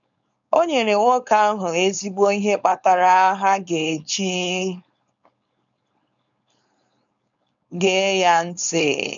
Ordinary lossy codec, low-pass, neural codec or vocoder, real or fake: none; 7.2 kHz; codec, 16 kHz, 4.8 kbps, FACodec; fake